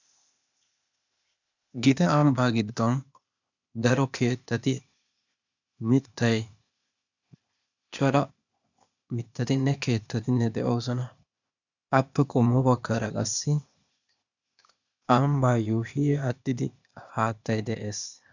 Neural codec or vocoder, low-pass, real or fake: codec, 16 kHz, 0.8 kbps, ZipCodec; 7.2 kHz; fake